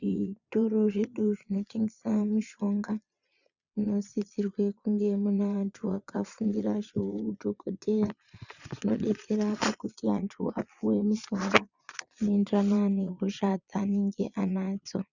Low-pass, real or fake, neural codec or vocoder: 7.2 kHz; fake; vocoder, 22.05 kHz, 80 mel bands, Vocos